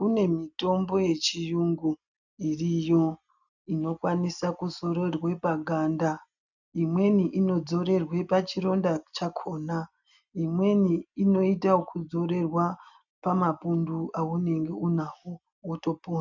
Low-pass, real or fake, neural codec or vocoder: 7.2 kHz; real; none